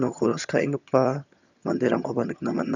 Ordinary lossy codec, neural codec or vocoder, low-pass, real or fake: none; vocoder, 22.05 kHz, 80 mel bands, HiFi-GAN; 7.2 kHz; fake